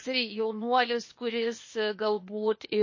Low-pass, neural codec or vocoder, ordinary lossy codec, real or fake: 7.2 kHz; codec, 16 kHz, 0.8 kbps, ZipCodec; MP3, 32 kbps; fake